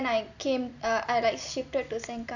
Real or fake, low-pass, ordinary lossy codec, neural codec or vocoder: real; 7.2 kHz; none; none